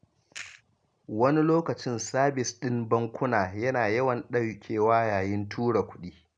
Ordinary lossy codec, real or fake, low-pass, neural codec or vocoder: none; real; 9.9 kHz; none